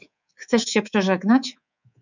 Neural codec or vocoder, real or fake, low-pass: codec, 24 kHz, 3.1 kbps, DualCodec; fake; 7.2 kHz